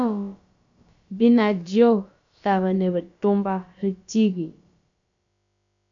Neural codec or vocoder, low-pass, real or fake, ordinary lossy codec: codec, 16 kHz, about 1 kbps, DyCAST, with the encoder's durations; 7.2 kHz; fake; MP3, 64 kbps